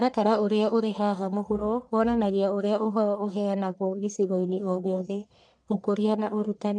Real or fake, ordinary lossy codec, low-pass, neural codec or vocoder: fake; none; 9.9 kHz; codec, 44.1 kHz, 1.7 kbps, Pupu-Codec